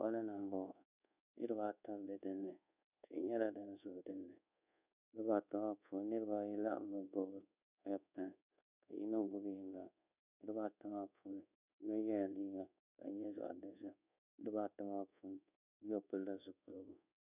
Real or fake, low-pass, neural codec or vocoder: fake; 3.6 kHz; codec, 24 kHz, 1.2 kbps, DualCodec